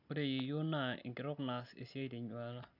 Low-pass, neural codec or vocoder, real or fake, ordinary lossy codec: 5.4 kHz; none; real; none